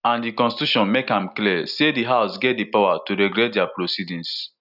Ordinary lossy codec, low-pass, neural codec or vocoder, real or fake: none; 5.4 kHz; none; real